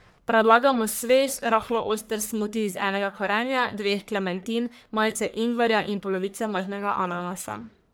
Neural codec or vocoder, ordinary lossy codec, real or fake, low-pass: codec, 44.1 kHz, 1.7 kbps, Pupu-Codec; none; fake; none